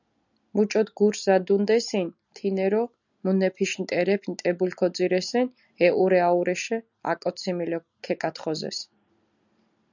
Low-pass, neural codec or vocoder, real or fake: 7.2 kHz; none; real